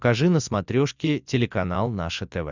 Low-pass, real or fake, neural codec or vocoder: 7.2 kHz; real; none